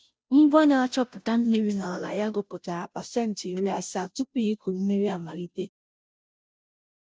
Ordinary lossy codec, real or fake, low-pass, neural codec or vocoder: none; fake; none; codec, 16 kHz, 0.5 kbps, FunCodec, trained on Chinese and English, 25 frames a second